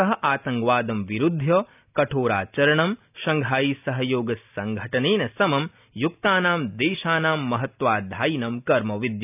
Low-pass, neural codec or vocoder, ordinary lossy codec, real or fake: 3.6 kHz; none; none; real